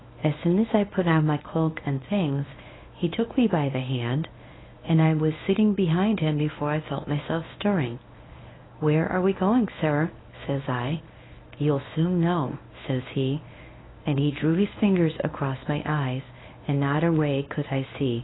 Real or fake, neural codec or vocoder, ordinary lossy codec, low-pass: fake; codec, 24 kHz, 0.9 kbps, WavTokenizer, medium speech release version 1; AAC, 16 kbps; 7.2 kHz